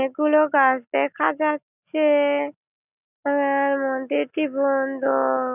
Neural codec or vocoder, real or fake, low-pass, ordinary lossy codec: none; real; 3.6 kHz; none